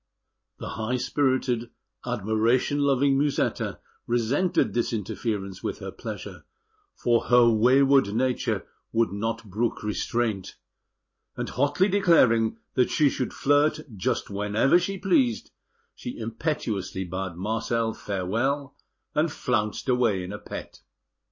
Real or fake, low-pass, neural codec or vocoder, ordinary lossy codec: real; 7.2 kHz; none; MP3, 32 kbps